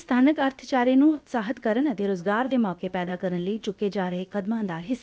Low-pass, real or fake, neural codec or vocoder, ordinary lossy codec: none; fake; codec, 16 kHz, about 1 kbps, DyCAST, with the encoder's durations; none